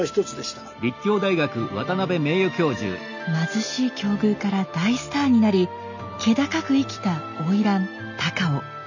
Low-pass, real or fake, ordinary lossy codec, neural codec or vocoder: 7.2 kHz; real; none; none